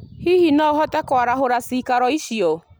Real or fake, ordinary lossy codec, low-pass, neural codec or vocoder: real; none; none; none